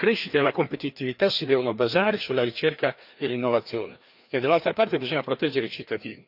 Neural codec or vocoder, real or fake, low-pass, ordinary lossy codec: codec, 16 kHz, 2 kbps, FreqCodec, larger model; fake; 5.4 kHz; AAC, 48 kbps